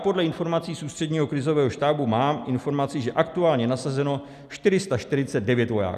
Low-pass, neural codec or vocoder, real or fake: 14.4 kHz; none; real